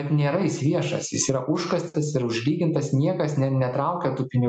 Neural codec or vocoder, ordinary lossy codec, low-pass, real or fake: none; MP3, 96 kbps; 9.9 kHz; real